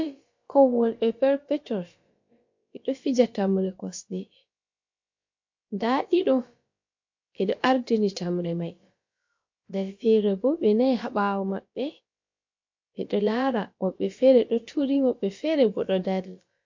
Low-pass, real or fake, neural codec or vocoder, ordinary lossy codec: 7.2 kHz; fake; codec, 16 kHz, about 1 kbps, DyCAST, with the encoder's durations; MP3, 48 kbps